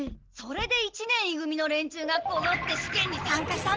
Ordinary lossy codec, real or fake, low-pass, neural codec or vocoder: Opus, 16 kbps; real; 7.2 kHz; none